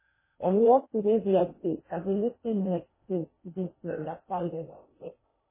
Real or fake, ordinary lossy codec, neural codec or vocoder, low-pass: fake; MP3, 16 kbps; codec, 16 kHz in and 24 kHz out, 0.8 kbps, FocalCodec, streaming, 65536 codes; 3.6 kHz